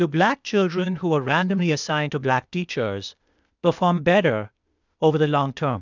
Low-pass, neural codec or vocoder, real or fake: 7.2 kHz; codec, 16 kHz, 0.8 kbps, ZipCodec; fake